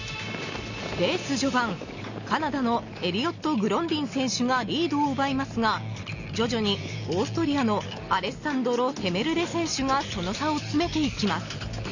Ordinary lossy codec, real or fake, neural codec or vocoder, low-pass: none; real; none; 7.2 kHz